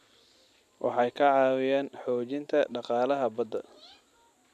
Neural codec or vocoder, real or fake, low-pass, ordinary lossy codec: vocoder, 44.1 kHz, 128 mel bands every 256 samples, BigVGAN v2; fake; 14.4 kHz; none